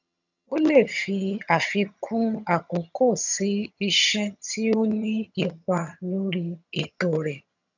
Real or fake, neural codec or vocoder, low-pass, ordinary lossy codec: fake; vocoder, 22.05 kHz, 80 mel bands, HiFi-GAN; 7.2 kHz; none